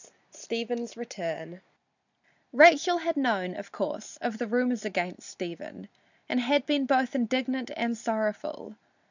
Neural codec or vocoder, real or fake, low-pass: none; real; 7.2 kHz